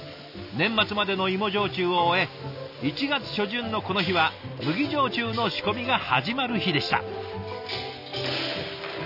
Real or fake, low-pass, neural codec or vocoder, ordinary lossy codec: real; 5.4 kHz; none; none